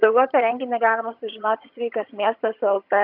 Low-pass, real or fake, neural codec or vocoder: 5.4 kHz; fake; vocoder, 22.05 kHz, 80 mel bands, HiFi-GAN